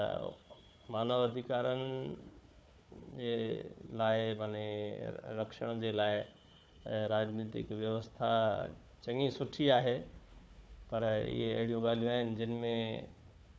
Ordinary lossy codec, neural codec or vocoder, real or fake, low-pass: none; codec, 16 kHz, 4 kbps, FunCodec, trained on Chinese and English, 50 frames a second; fake; none